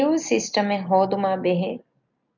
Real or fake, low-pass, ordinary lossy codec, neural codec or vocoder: real; 7.2 kHz; MP3, 64 kbps; none